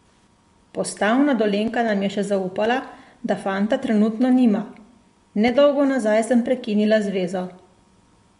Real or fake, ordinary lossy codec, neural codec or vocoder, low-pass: fake; MP3, 64 kbps; vocoder, 24 kHz, 100 mel bands, Vocos; 10.8 kHz